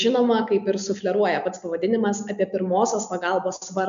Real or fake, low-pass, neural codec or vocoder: real; 7.2 kHz; none